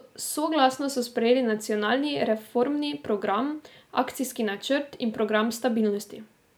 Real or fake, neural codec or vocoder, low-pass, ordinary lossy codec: real; none; none; none